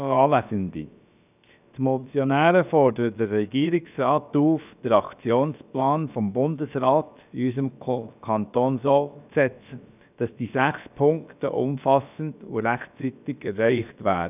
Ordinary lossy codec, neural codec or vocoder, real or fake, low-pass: none; codec, 16 kHz, about 1 kbps, DyCAST, with the encoder's durations; fake; 3.6 kHz